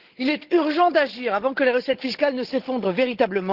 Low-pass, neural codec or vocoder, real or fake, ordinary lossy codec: 5.4 kHz; codec, 16 kHz, 16 kbps, FunCodec, trained on Chinese and English, 50 frames a second; fake; Opus, 16 kbps